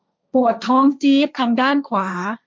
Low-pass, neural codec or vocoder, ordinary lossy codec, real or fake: none; codec, 16 kHz, 1.1 kbps, Voila-Tokenizer; none; fake